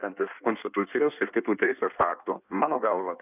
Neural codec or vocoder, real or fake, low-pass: codec, 16 kHz in and 24 kHz out, 1.1 kbps, FireRedTTS-2 codec; fake; 3.6 kHz